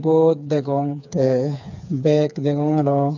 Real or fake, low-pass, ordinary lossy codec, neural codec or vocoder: fake; 7.2 kHz; none; codec, 16 kHz, 4 kbps, FreqCodec, smaller model